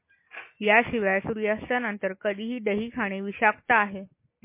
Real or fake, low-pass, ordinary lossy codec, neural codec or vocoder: real; 3.6 kHz; MP3, 24 kbps; none